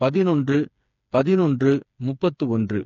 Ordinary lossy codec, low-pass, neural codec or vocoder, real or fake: AAC, 48 kbps; 7.2 kHz; codec, 16 kHz, 4 kbps, FreqCodec, smaller model; fake